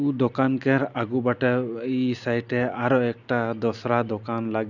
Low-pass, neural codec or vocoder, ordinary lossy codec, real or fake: 7.2 kHz; none; none; real